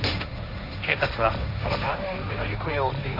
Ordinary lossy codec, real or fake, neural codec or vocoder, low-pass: none; fake; codec, 16 kHz, 1.1 kbps, Voila-Tokenizer; 5.4 kHz